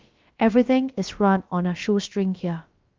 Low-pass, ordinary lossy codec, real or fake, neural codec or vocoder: 7.2 kHz; Opus, 32 kbps; fake; codec, 16 kHz, about 1 kbps, DyCAST, with the encoder's durations